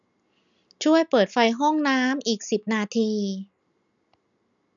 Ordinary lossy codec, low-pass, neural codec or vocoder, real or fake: none; 7.2 kHz; none; real